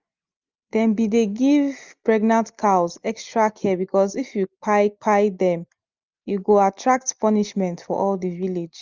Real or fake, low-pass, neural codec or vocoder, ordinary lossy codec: real; 7.2 kHz; none; Opus, 24 kbps